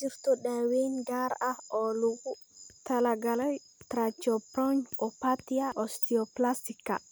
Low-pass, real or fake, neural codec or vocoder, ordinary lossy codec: none; real; none; none